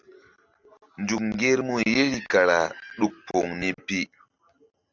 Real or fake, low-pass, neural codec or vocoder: real; 7.2 kHz; none